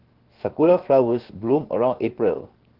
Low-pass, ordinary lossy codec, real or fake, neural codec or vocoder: 5.4 kHz; Opus, 16 kbps; fake; codec, 16 kHz, 0.7 kbps, FocalCodec